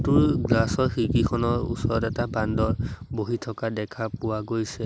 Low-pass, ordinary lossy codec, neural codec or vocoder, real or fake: none; none; none; real